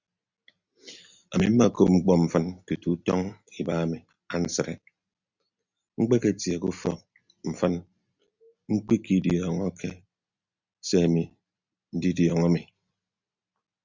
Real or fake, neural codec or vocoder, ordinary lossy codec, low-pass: real; none; Opus, 64 kbps; 7.2 kHz